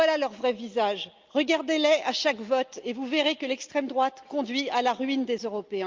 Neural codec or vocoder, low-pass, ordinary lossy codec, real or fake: none; 7.2 kHz; Opus, 24 kbps; real